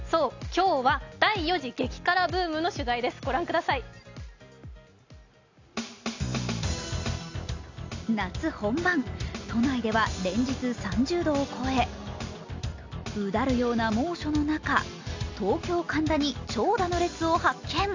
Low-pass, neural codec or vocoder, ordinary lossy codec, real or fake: 7.2 kHz; none; AAC, 48 kbps; real